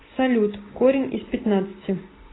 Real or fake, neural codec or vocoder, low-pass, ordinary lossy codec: real; none; 7.2 kHz; AAC, 16 kbps